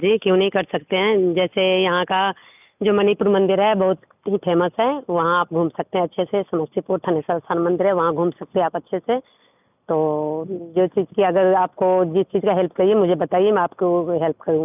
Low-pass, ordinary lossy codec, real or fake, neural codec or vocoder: 3.6 kHz; none; real; none